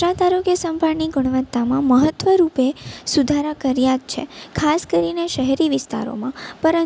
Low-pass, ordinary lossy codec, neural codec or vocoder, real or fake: none; none; none; real